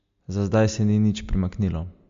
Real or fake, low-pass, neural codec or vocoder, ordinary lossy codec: real; 7.2 kHz; none; MP3, 48 kbps